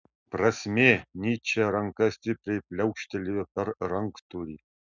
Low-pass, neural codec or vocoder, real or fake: 7.2 kHz; none; real